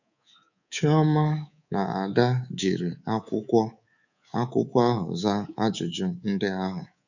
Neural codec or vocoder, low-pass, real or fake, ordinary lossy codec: codec, 24 kHz, 3.1 kbps, DualCodec; 7.2 kHz; fake; none